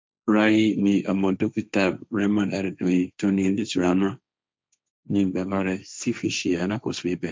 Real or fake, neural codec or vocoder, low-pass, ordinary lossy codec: fake; codec, 16 kHz, 1.1 kbps, Voila-Tokenizer; none; none